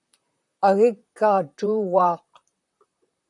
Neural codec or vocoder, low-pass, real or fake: vocoder, 44.1 kHz, 128 mel bands, Pupu-Vocoder; 10.8 kHz; fake